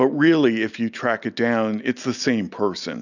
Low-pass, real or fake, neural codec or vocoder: 7.2 kHz; real; none